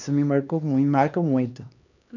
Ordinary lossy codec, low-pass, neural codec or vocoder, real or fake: none; 7.2 kHz; codec, 16 kHz, 2 kbps, X-Codec, HuBERT features, trained on LibriSpeech; fake